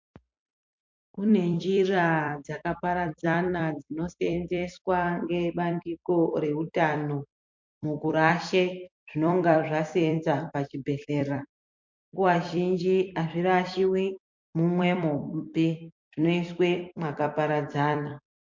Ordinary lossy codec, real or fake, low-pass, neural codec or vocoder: MP3, 48 kbps; fake; 7.2 kHz; vocoder, 44.1 kHz, 128 mel bands every 256 samples, BigVGAN v2